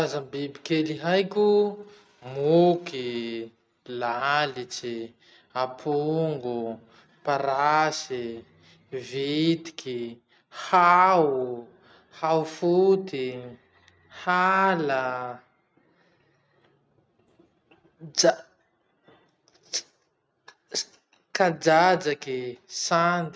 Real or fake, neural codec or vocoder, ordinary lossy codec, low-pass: real; none; none; none